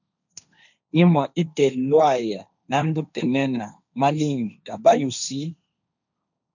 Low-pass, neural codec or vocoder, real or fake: 7.2 kHz; codec, 16 kHz, 1.1 kbps, Voila-Tokenizer; fake